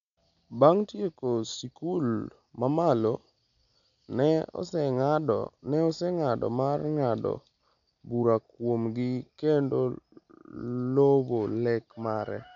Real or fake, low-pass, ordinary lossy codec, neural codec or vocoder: real; 7.2 kHz; none; none